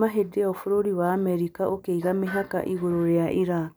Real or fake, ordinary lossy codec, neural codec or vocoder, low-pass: real; none; none; none